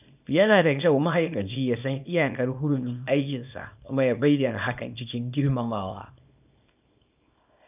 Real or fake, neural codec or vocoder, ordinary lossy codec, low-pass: fake; codec, 24 kHz, 0.9 kbps, WavTokenizer, small release; none; 3.6 kHz